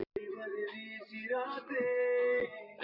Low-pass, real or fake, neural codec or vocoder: 5.4 kHz; fake; vocoder, 44.1 kHz, 128 mel bands every 512 samples, BigVGAN v2